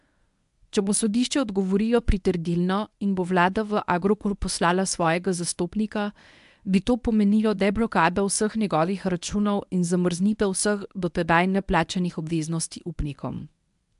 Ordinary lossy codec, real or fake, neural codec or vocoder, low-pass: AAC, 96 kbps; fake; codec, 24 kHz, 0.9 kbps, WavTokenizer, medium speech release version 1; 10.8 kHz